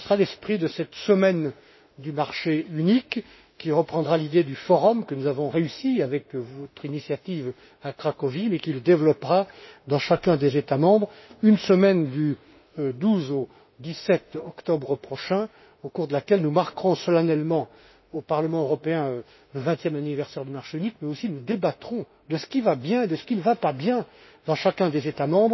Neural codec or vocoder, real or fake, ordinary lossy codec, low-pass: autoencoder, 48 kHz, 32 numbers a frame, DAC-VAE, trained on Japanese speech; fake; MP3, 24 kbps; 7.2 kHz